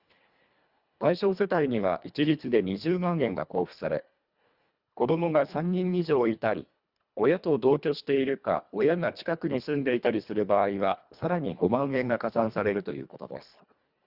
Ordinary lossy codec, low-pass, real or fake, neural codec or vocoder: Opus, 64 kbps; 5.4 kHz; fake; codec, 24 kHz, 1.5 kbps, HILCodec